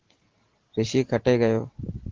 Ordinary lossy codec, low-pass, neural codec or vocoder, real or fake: Opus, 16 kbps; 7.2 kHz; none; real